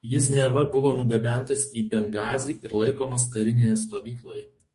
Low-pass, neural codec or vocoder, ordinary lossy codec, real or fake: 14.4 kHz; codec, 44.1 kHz, 2.6 kbps, DAC; MP3, 48 kbps; fake